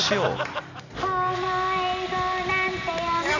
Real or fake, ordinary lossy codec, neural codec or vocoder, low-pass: real; none; none; 7.2 kHz